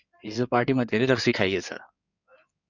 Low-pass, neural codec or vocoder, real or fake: 7.2 kHz; codec, 16 kHz in and 24 kHz out, 2.2 kbps, FireRedTTS-2 codec; fake